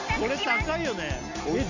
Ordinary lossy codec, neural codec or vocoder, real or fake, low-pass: none; none; real; 7.2 kHz